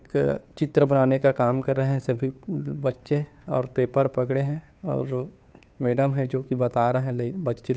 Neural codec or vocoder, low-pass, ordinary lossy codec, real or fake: codec, 16 kHz, 2 kbps, FunCodec, trained on Chinese and English, 25 frames a second; none; none; fake